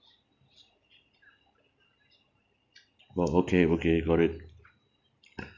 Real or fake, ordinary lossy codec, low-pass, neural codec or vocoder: real; none; 7.2 kHz; none